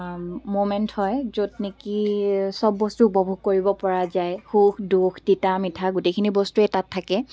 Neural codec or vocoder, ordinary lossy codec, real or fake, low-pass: none; none; real; none